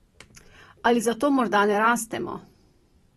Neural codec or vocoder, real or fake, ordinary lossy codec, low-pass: vocoder, 44.1 kHz, 128 mel bands every 512 samples, BigVGAN v2; fake; AAC, 32 kbps; 19.8 kHz